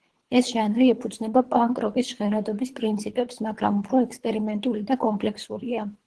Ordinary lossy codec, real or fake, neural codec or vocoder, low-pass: Opus, 16 kbps; fake; codec, 24 kHz, 3 kbps, HILCodec; 10.8 kHz